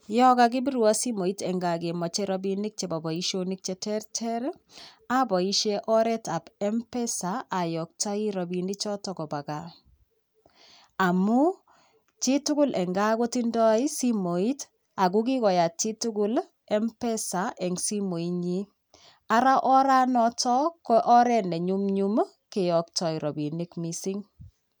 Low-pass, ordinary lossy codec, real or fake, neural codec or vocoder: none; none; real; none